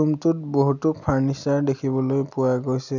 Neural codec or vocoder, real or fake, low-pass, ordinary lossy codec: none; real; 7.2 kHz; none